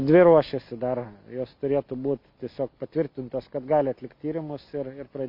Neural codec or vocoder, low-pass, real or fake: none; 5.4 kHz; real